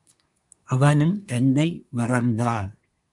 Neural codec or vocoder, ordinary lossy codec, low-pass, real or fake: codec, 24 kHz, 1 kbps, SNAC; MP3, 96 kbps; 10.8 kHz; fake